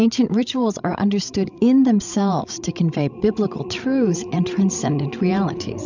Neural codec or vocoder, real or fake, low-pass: codec, 16 kHz, 16 kbps, FreqCodec, larger model; fake; 7.2 kHz